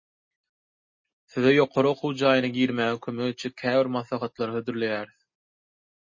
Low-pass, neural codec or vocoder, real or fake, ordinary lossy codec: 7.2 kHz; none; real; MP3, 32 kbps